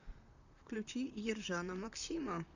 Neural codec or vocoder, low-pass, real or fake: vocoder, 44.1 kHz, 128 mel bands, Pupu-Vocoder; 7.2 kHz; fake